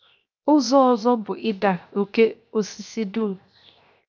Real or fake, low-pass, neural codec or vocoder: fake; 7.2 kHz; codec, 16 kHz, 0.7 kbps, FocalCodec